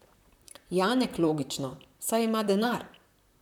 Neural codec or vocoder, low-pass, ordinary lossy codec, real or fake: vocoder, 44.1 kHz, 128 mel bands, Pupu-Vocoder; 19.8 kHz; none; fake